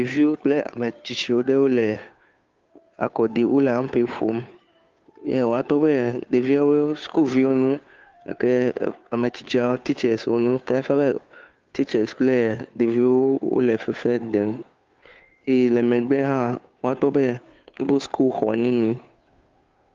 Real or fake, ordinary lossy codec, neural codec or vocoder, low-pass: fake; Opus, 32 kbps; codec, 16 kHz, 2 kbps, FunCodec, trained on Chinese and English, 25 frames a second; 7.2 kHz